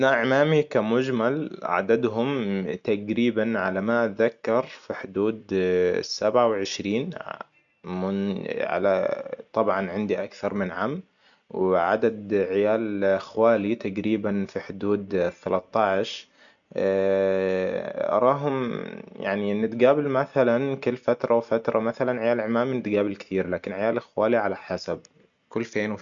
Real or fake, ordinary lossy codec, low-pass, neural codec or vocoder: real; none; 7.2 kHz; none